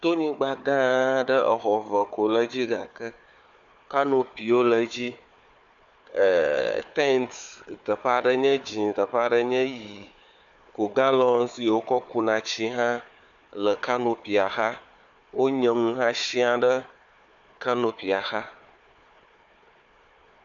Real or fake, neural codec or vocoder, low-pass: fake; codec, 16 kHz, 4 kbps, FunCodec, trained on Chinese and English, 50 frames a second; 7.2 kHz